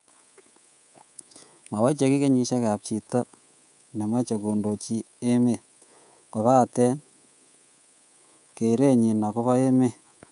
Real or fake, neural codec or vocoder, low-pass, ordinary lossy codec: fake; codec, 24 kHz, 3.1 kbps, DualCodec; 10.8 kHz; none